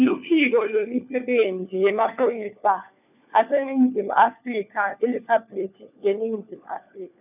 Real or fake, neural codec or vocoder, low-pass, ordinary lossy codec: fake; codec, 16 kHz, 4 kbps, FunCodec, trained on LibriTTS, 50 frames a second; 3.6 kHz; none